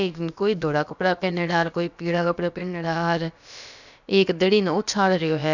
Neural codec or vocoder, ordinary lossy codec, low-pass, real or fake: codec, 16 kHz, about 1 kbps, DyCAST, with the encoder's durations; none; 7.2 kHz; fake